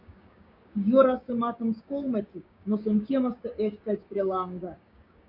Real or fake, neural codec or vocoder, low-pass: fake; codec, 16 kHz, 6 kbps, DAC; 5.4 kHz